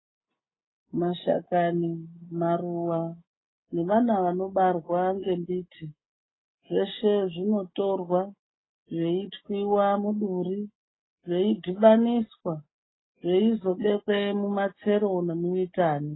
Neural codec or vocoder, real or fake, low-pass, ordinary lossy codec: none; real; 7.2 kHz; AAC, 16 kbps